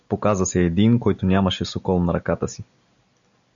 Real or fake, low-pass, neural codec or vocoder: real; 7.2 kHz; none